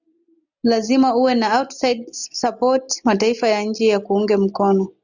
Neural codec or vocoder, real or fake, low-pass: none; real; 7.2 kHz